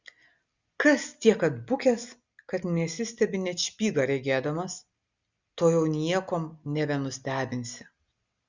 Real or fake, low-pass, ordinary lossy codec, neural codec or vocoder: real; 7.2 kHz; Opus, 64 kbps; none